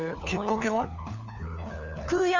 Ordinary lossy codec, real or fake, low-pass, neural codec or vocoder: none; fake; 7.2 kHz; codec, 16 kHz, 4 kbps, FunCodec, trained on LibriTTS, 50 frames a second